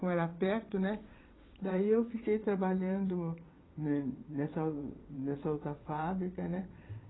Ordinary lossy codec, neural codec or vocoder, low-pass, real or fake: AAC, 16 kbps; vocoder, 22.05 kHz, 80 mel bands, WaveNeXt; 7.2 kHz; fake